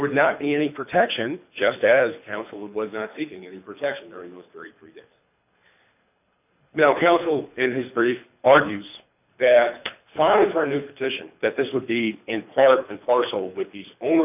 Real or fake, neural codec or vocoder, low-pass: fake; codec, 24 kHz, 3 kbps, HILCodec; 3.6 kHz